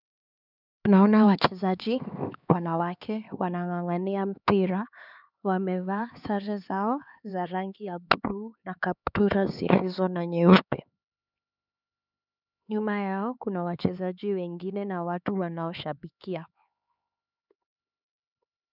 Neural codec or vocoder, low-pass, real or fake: codec, 16 kHz, 4 kbps, X-Codec, HuBERT features, trained on LibriSpeech; 5.4 kHz; fake